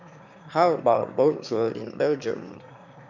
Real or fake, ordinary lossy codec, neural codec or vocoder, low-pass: fake; none; autoencoder, 22.05 kHz, a latent of 192 numbers a frame, VITS, trained on one speaker; 7.2 kHz